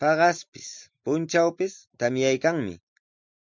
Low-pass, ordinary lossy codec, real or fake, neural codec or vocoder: 7.2 kHz; MP3, 64 kbps; real; none